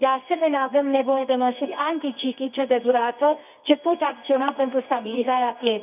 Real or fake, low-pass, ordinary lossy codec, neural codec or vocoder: fake; 3.6 kHz; none; codec, 24 kHz, 0.9 kbps, WavTokenizer, medium music audio release